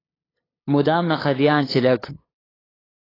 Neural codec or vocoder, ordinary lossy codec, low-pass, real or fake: codec, 16 kHz, 8 kbps, FunCodec, trained on LibriTTS, 25 frames a second; AAC, 24 kbps; 5.4 kHz; fake